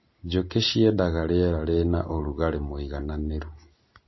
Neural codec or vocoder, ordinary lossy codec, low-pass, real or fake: none; MP3, 24 kbps; 7.2 kHz; real